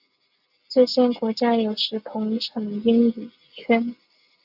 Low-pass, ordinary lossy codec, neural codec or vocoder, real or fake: 5.4 kHz; AAC, 48 kbps; none; real